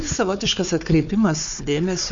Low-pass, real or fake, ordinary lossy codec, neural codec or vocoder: 7.2 kHz; fake; MP3, 48 kbps; codec, 16 kHz, 4 kbps, FunCodec, trained on Chinese and English, 50 frames a second